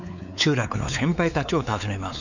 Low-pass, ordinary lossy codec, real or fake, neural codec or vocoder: 7.2 kHz; none; fake; codec, 16 kHz, 4 kbps, X-Codec, WavLM features, trained on Multilingual LibriSpeech